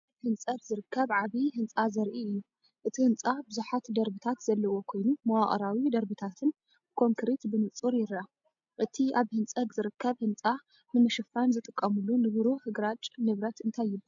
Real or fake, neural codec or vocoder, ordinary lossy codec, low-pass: real; none; MP3, 64 kbps; 7.2 kHz